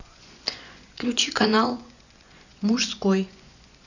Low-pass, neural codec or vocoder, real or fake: 7.2 kHz; vocoder, 24 kHz, 100 mel bands, Vocos; fake